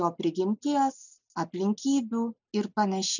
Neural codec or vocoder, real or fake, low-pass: none; real; 7.2 kHz